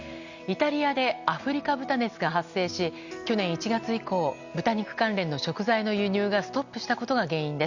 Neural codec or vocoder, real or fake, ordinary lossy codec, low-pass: none; real; Opus, 64 kbps; 7.2 kHz